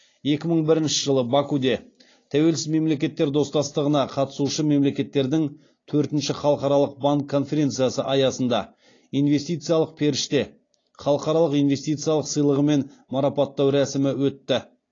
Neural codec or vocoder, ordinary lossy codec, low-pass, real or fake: none; AAC, 32 kbps; 7.2 kHz; real